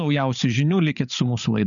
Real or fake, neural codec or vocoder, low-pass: fake; codec, 16 kHz, 8 kbps, FreqCodec, larger model; 7.2 kHz